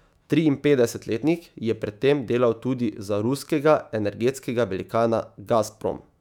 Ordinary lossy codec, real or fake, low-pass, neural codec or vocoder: none; fake; 19.8 kHz; autoencoder, 48 kHz, 128 numbers a frame, DAC-VAE, trained on Japanese speech